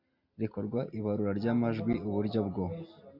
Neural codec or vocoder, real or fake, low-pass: none; real; 5.4 kHz